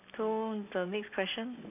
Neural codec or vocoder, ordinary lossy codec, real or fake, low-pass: none; none; real; 3.6 kHz